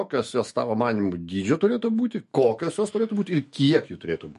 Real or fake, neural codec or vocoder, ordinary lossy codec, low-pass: fake; autoencoder, 48 kHz, 128 numbers a frame, DAC-VAE, trained on Japanese speech; MP3, 48 kbps; 14.4 kHz